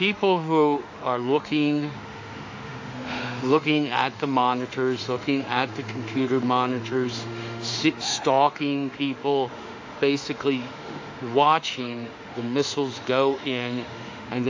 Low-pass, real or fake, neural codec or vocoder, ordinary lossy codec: 7.2 kHz; fake; autoencoder, 48 kHz, 32 numbers a frame, DAC-VAE, trained on Japanese speech; AAC, 48 kbps